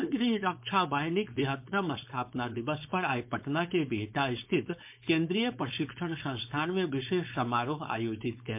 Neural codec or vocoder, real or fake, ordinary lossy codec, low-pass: codec, 16 kHz, 4.8 kbps, FACodec; fake; MP3, 32 kbps; 3.6 kHz